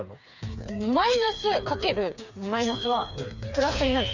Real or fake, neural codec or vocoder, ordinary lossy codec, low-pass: fake; codec, 16 kHz, 4 kbps, FreqCodec, smaller model; none; 7.2 kHz